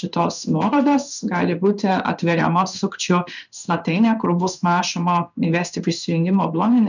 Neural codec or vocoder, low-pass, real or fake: codec, 16 kHz in and 24 kHz out, 1 kbps, XY-Tokenizer; 7.2 kHz; fake